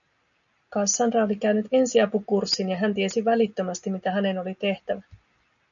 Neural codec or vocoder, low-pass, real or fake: none; 7.2 kHz; real